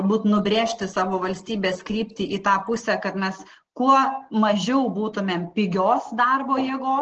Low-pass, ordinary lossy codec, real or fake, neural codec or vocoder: 7.2 kHz; Opus, 16 kbps; real; none